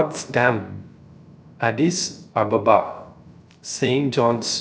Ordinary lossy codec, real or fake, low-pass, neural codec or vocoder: none; fake; none; codec, 16 kHz, 0.3 kbps, FocalCodec